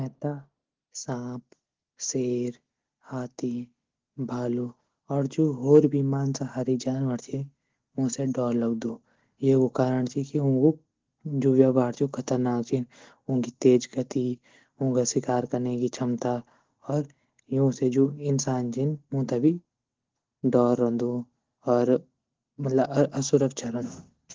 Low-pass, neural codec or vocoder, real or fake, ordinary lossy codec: 7.2 kHz; none; real; Opus, 16 kbps